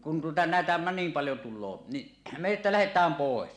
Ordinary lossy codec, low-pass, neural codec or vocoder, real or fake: MP3, 96 kbps; 9.9 kHz; none; real